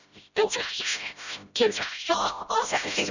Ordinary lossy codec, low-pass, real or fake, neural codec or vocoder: none; 7.2 kHz; fake; codec, 16 kHz, 0.5 kbps, FreqCodec, smaller model